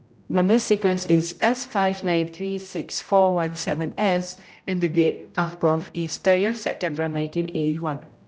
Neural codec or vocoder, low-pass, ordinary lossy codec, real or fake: codec, 16 kHz, 0.5 kbps, X-Codec, HuBERT features, trained on general audio; none; none; fake